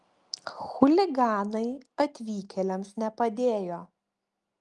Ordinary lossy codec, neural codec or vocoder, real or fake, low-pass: Opus, 32 kbps; none; real; 10.8 kHz